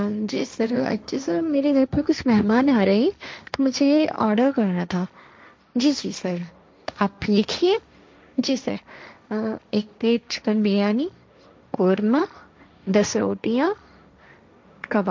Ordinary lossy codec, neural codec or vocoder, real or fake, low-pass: none; codec, 16 kHz, 1.1 kbps, Voila-Tokenizer; fake; none